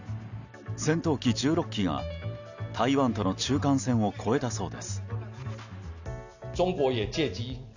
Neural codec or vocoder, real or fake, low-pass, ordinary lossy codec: none; real; 7.2 kHz; none